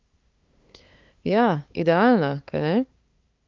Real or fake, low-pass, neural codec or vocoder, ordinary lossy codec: fake; 7.2 kHz; codec, 16 kHz, 2 kbps, FunCodec, trained on LibriTTS, 25 frames a second; Opus, 24 kbps